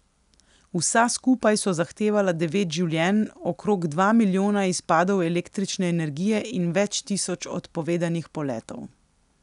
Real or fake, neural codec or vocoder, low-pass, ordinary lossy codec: real; none; 10.8 kHz; none